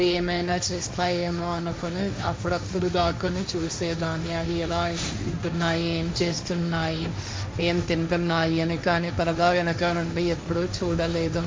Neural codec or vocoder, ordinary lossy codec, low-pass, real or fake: codec, 16 kHz, 1.1 kbps, Voila-Tokenizer; none; none; fake